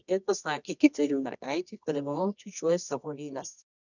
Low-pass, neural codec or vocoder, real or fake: 7.2 kHz; codec, 24 kHz, 0.9 kbps, WavTokenizer, medium music audio release; fake